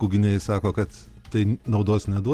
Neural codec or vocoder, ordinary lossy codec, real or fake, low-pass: none; Opus, 16 kbps; real; 14.4 kHz